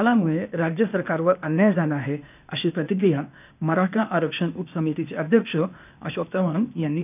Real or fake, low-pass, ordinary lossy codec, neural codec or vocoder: fake; 3.6 kHz; none; codec, 16 kHz, 0.8 kbps, ZipCodec